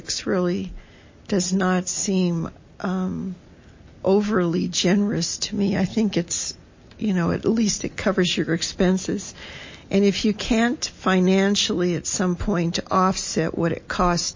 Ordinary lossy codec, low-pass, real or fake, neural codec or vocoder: MP3, 32 kbps; 7.2 kHz; real; none